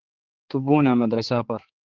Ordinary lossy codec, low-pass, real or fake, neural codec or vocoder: Opus, 16 kbps; 7.2 kHz; fake; codec, 16 kHz, 4 kbps, X-Codec, HuBERT features, trained on general audio